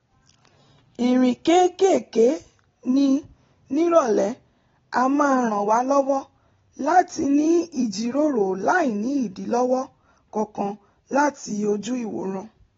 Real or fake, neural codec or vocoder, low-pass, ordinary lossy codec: real; none; 7.2 kHz; AAC, 24 kbps